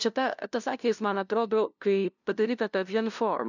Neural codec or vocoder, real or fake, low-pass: codec, 16 kHz, 0.5 kbps, FunCodec, trained on LibriTTS, 25 frames a second; fake; 7.2 kHz